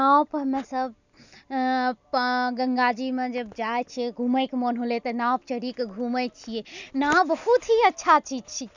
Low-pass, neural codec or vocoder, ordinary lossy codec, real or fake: 7.2 kHz; none; none; real